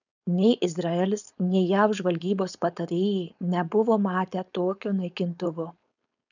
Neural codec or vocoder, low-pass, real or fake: codec, 16 kHz, 4.8 kbps, FACodec; 7.2 kHz; fake